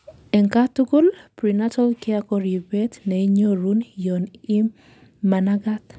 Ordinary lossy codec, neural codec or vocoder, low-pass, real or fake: none; none; none; real